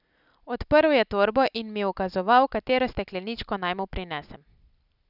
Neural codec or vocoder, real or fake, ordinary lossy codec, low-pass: none; real; none; 5.4 kHz